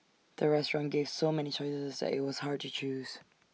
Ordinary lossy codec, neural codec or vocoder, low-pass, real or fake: none; none; none; real